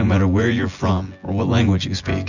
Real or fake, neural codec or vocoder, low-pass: fake; vocoder, 24 kHz, 100 mel bands, Vocos; 7.2 kHz